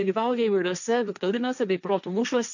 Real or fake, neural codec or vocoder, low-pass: fake; codec, 16 kHz, 1.1 kbps, Voila-Tokenizer; 7.2 kHz